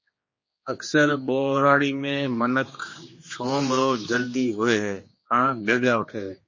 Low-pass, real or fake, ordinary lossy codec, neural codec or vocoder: 7.2 kHz; fake; MP3, 32 kbps; codec, 16 kHz, 2 kbps, X-Codec, HuBERT features, trained on general audio